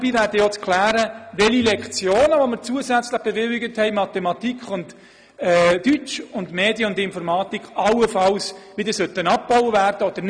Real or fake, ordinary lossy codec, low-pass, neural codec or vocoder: real; none; 9.9 kHz; none